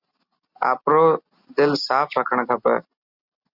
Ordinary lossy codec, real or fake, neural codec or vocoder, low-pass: Opus, 64 kbps; real; none; 5.4 kHz